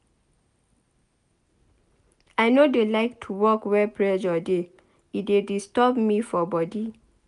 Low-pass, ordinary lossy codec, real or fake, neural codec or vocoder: 10.8 kHz; none; real; none